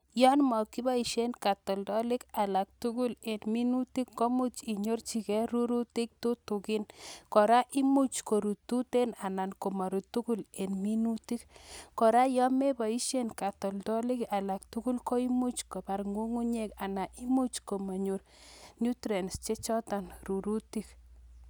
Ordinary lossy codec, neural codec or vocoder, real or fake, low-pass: none; none; real; none